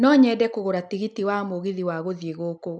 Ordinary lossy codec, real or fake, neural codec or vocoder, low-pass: none; real; none; 9.9 kHz